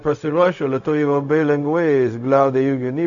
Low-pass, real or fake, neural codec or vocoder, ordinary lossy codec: 7.2 kHz; fake; codec, 16 kHz, 0.4 kbps, LongCat-Audio-Codec; AAC, 64 kbps